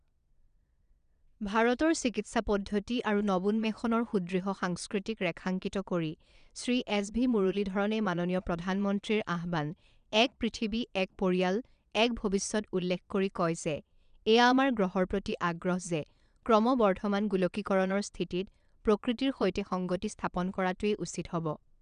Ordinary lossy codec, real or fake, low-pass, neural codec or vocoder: none; fake; 9.9 kHz; vocoder, 22.05 kHz, 80 mel bands, Vocos